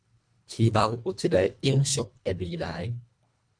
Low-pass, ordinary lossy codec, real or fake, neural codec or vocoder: 9.9 kHz; MP3, 96 kbps; fake; codec, 24 kHz, 1.5 kbps, HILCodec